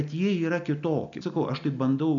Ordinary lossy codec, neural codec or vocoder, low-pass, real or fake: MP3, 96 kbps; none; 7.2 kHz; real